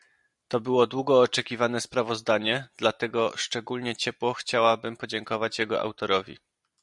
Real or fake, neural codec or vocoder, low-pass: real; none; 10.8 kHz